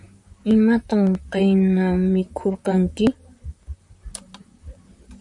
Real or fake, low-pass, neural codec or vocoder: fake; 10.8 kHz; vocoder, 44.1 kHz, 128 mel bands, Pupu-Vocoder